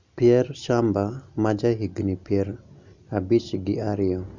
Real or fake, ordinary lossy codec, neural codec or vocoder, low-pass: real; none; none; 7.2 kHz